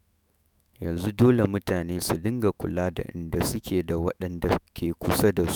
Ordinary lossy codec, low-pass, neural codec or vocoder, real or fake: none; none; autoencoder, 48 kHz, 128 numbers a frame, DAC-VAE, trained on Japanese speech; fake